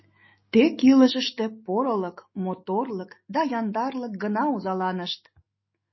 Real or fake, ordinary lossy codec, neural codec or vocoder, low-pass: fake; MP3, 24 kbps; autoencoder, 48 kHz, 128 numbers a frame, DAC-VAE, trained on Japanese speech; 7.2 kHz